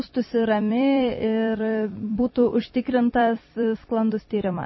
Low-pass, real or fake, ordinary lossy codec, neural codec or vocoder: 7.2 kHz; fake; MP3, 24 kbps; vocoder, 44.1 kHz, 128 mel bands every 256 samples, BigVGAN v2